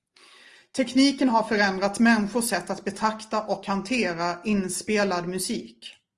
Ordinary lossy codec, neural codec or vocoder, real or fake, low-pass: Opus, 24 kbps; none; real; 10.8 kHz